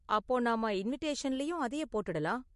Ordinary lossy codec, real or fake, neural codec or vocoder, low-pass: MP3, 48 kbps; real; none; 14.4 kHz